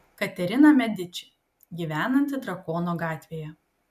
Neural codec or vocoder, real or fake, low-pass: none; real; 14.4 kHz